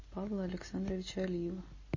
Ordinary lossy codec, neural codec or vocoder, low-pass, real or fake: MP3, 32 kbps; none; 7.2 kHz; real